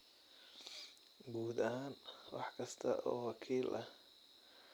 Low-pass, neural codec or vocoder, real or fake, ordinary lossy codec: none; none; real; none